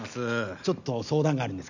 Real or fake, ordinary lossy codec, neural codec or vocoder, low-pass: real; none; none; 7.2 kHz